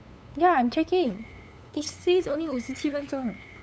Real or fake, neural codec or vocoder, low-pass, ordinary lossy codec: fake; codec, 16 kHz, 8 kbps, FunCodec, trained on LibriTTS, 25 frames a second; none; none